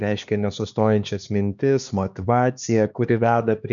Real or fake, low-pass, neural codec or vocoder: fake; 7.2 kHz; codec, 16 kHz, 2 kbps, X-Codec, HuBERT features, trained on LibriSpeech